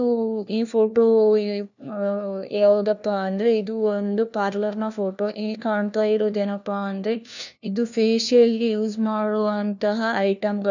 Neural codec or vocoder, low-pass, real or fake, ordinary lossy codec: codec, 16 kHz, 1 kbps, FunCodec, trained on LibriTTS, 50 frames a second; 7.2 kHz; fake; none